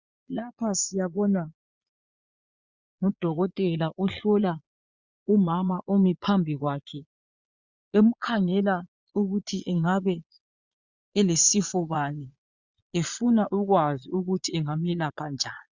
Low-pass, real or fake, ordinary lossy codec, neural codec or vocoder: 7.2 kHz; fake; Opus, 64 kbps; vocoder, 22.05 kHz, 80 mel bands, Vocos